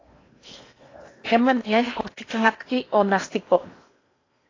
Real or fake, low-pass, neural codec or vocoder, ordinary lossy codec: fake; 7.2 kHz; codec, 16 kHz in and 24 kHz out, 0.8 kbps, FocalCodec, streaming, 65536 codes; AAC, 32 kbps